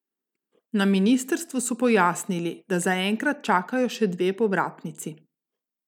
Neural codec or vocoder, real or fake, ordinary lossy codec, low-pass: none; real; none; 19.8 kHz